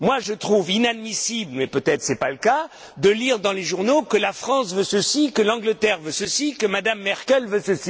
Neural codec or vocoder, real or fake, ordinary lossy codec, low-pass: none; real; none; none